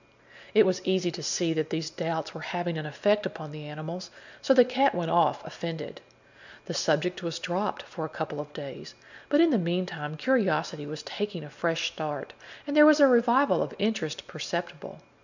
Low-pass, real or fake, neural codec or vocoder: 7.2 kHz; real; none